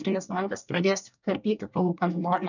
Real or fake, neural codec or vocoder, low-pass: fake; codec, 24 kHz, 1 kbps, SNAC; 7.2 kHz